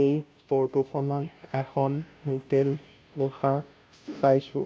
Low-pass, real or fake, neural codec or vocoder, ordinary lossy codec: none; fake; codec, 16 kHz, 0.5 kbps, FunCodec, trained on Chinese and English, 25 frames a second; none